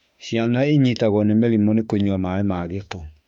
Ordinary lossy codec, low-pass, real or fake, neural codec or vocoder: none; 19.8 kHz; fake; autoencoder, 48 kHz, 32 numbers a frame, DAC-VAE, trained on Japanese speech